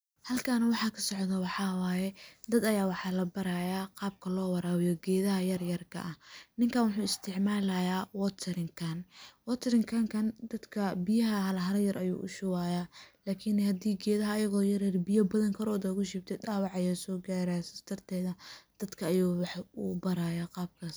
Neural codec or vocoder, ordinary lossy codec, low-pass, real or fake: none; none; none; real